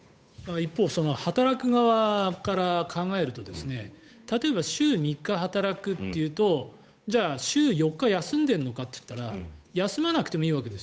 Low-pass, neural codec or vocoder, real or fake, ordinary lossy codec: none; codec, 16 kHz, 8 kbps, FunCodec, trained on Chinese and English, 25 frames a second; fake; none